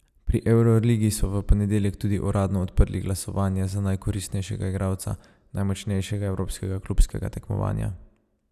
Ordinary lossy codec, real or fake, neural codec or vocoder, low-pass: none; real; none; 14.4 kHz